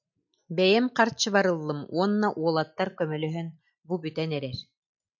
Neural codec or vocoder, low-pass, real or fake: none; 7.2 kHz; real